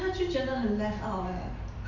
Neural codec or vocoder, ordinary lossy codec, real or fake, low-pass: none; none; real; 7.2 kHz